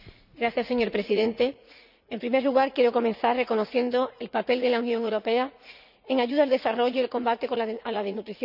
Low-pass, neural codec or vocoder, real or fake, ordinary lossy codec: 5.4 kHz; vocoder, 44.1 kHz, 80 mel bands, Vocos; fake; none